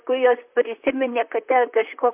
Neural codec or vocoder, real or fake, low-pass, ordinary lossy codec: vocoder, 44.1 kHz, 128 mel bands, Pupu-Vocoder; fake; 3.6 kHz; MP3, 32 kbps